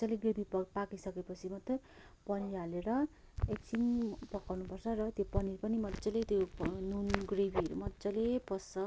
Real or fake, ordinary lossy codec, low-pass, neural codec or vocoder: real; none; none; none